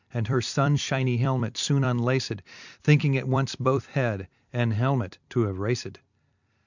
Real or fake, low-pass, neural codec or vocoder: fake; 7.2 kHz; vocoder, 44.1 kHz, 128 mel bands every 256 samples, BigVGAN v2